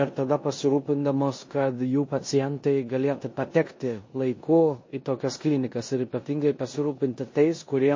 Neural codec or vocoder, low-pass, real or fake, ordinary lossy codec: codec, 16 kHz in and 24 kHz out, 0.9 kbps, LongCat-Audio-Codec, four codebook decoder; 7.2 kHz; fake; MP3, 32 kbps